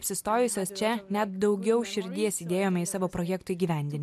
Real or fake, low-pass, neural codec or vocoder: real; 14.4 kHz; none